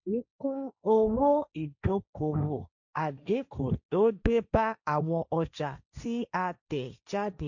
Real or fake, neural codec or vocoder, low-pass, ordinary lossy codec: fake; codec, 16 kHz, 1.1 kbps, Voila-Tokenizer; 7.2 kHz; none